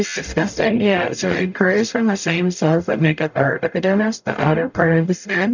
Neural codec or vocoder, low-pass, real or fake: codec, 44.1 kHz, 0.9 kbps, DAC; 7.2 kHz; fake